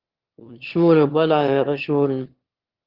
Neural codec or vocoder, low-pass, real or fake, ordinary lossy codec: autoencoder, 22.05 kHz, a latent of 192 numbers a frame, VITS, trained on one speaker; 5.4 kHz; fake; Opus, 16 kbps